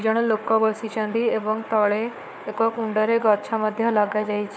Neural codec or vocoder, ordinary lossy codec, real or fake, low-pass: codec, 16 kHz, 4 kbps, FunCodec, trained on Chinese and English, 50 frames a second; none; fake; none